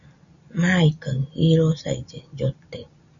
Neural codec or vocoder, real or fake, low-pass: none; real; 7.2 kHz